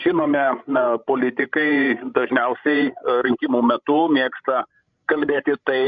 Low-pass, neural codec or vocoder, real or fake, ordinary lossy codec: 7.2 kHz; codec, 16 kHz, 16 kbps, FreqCodec, larger model; fake; MP3, 64 kbps